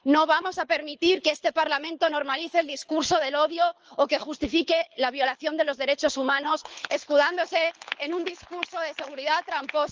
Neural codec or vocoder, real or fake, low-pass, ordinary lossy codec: codec, 24 kHz, 6 kbps, HILCodec; fake; 7.2 kHz; Opus, 24 kbps